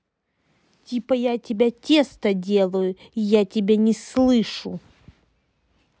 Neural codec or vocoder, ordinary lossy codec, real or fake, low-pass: none; none; real; none